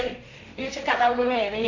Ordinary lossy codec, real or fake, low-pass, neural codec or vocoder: none; fake; 7.2 kHz; codec, 16 kHz, 1.1 kbps, Voila-Tokenizer